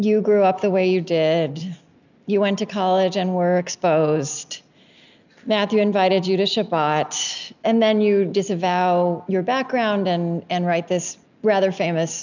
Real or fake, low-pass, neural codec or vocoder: real; 7.2 kHz; none